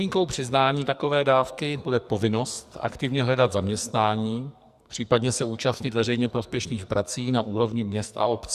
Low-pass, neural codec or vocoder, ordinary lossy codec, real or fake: 14.4 kHz; codec, 44.1 kHz, 2.6 kbps, SNAC; Opus, 64 kbps; fake